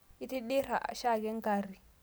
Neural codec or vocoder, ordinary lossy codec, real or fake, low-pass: none; none; real; none